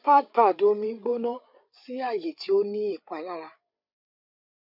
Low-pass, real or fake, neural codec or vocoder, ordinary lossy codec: 5.4 kHz; fake; codec, 16 kHz, 8 kbps, FreqCodec, larger model; none